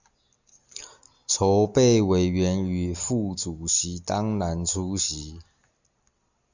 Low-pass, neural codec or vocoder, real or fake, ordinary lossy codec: 7.2 kHz; none; real; Opus, 64 kbps